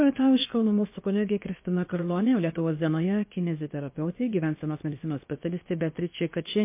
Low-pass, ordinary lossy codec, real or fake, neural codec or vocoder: 3.6 kHz; MP3, 24 kbps; fake; codec, 16 kHz, about 1 kbps, DyCAST, with the encoder's durations